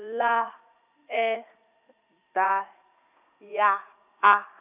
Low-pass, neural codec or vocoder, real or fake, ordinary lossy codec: 3.6 kHz; vocoder, 44.1 kHz, 80 mel bands, Vocos; fake; none